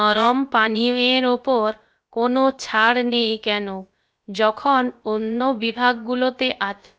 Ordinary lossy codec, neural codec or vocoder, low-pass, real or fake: none; codec, 16 kHz, about 1 kbps, DyCAST, with the encoder's durations; none; fake